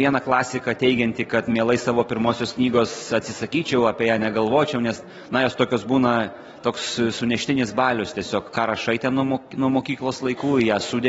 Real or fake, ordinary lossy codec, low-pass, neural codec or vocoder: real; AAC, 24 kbps; 19.8 kHz; none